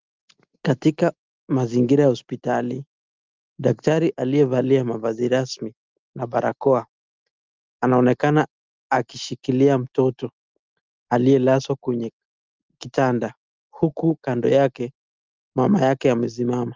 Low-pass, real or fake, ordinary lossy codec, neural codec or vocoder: 7.2 kHz; real; Opus, 32 kbps; none